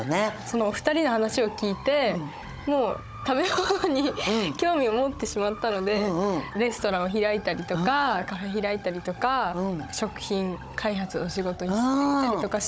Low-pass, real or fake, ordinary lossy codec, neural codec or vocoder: none; fake; none; codec, 16 kHz, 16 kbps, FunCodec, trained on Chinese and English, 50 frames a second